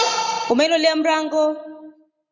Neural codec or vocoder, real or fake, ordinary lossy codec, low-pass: none; real; Opus, 64 kbps; 7.2 kHz